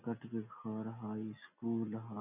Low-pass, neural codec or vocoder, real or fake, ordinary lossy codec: 3.6 kHz; none; real; none